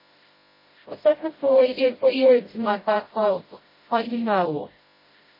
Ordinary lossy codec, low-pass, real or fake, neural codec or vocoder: MP3, 24 kbps; 5.4 kHz; fake; codec, 16 kHz, 0.5 kbps, FreqCodec, smaller model